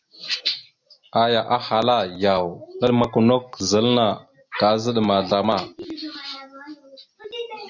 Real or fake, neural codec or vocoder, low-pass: real; none; 7.2 kHz